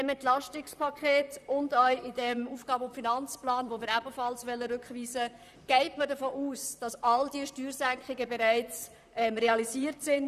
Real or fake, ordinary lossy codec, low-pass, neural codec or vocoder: fake; none; 14.4 kHz; vocoder, 44.1 kHz, 128 mel bands, Pupu-Vocoder